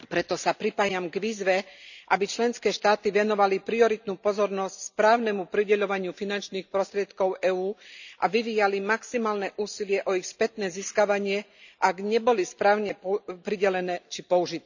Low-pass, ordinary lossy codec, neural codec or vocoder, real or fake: 7.2 kHz; none; none; real